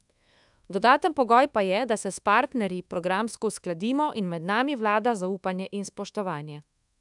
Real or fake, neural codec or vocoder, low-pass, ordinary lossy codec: fake; codec, 24 kHz, 1.2 kbps, DualCodec; 10.8 kHz; none